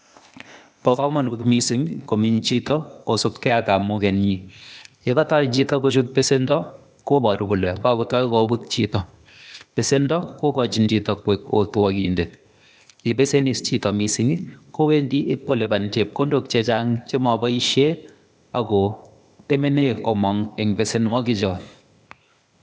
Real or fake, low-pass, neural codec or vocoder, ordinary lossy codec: fake; none; codec, 16 kHz, 0.8 kbps, ZipCodec; none